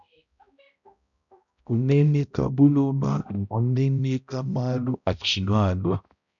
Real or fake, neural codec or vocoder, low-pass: fake; codec, 16 kHz, 0.5 kbps, X-Codec, HuBERT features, trained on balanced general audio; 7.2 kHz